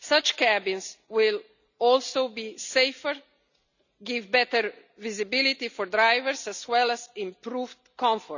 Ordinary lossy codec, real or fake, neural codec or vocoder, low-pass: none; real; none; 7.2 kHz